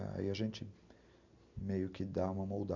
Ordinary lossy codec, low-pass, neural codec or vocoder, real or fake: MP3, 64 kbps; 7.2 kHz; none; real